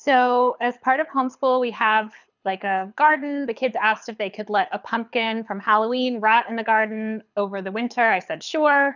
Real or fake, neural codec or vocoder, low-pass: fake; codec, 24 kHz, 6 kbps, HILCodec; 7.2 kHz